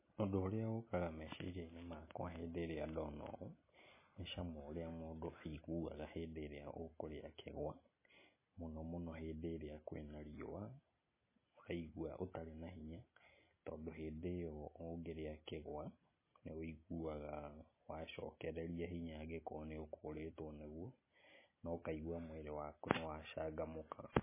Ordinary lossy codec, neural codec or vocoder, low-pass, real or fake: MP3, 16 kbps; none; 3.6 kHz; real